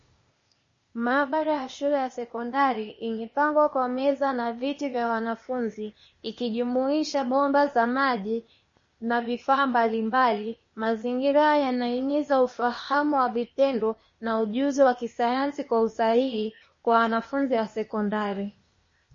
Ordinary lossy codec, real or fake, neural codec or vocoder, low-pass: MP3, 32 kbps; fake; codec, 16 kHz, 0.8 kbps, ZipCodec; 7.2 kHz